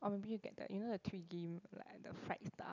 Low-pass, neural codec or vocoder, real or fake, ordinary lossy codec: 7.2 kHz; none; real; none